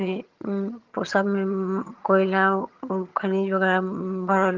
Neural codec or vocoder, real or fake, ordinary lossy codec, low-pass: vocoder, 22.05 kHz, 80 mel bands, HiFi-GAN; fake; Opus, 32 kbps; 7.2 kHz